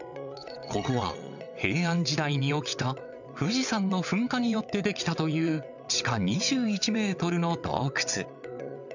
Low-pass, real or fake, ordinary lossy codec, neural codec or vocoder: 7.2 kHz; fake; none; vocoder, 22.05 kHz, 80 mel bands, WaveNeXt